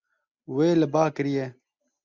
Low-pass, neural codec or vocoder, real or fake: 7.2 kHz; none; real